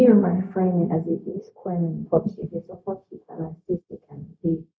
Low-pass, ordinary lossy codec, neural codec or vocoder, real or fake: none; none; codec, 16 kHz, 0.4 kbps, LongCat-Audio-Codec; fake